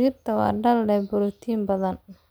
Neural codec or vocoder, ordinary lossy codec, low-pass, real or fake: none; none; none; real